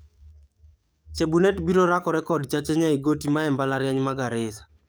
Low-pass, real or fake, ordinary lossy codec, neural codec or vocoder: none; fake; none; codec, 44.1 kHz, 7.8 kbps, DAC